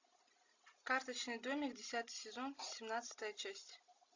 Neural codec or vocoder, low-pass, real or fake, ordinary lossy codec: none; 7.2 kHz; real; AAC, 48 kbps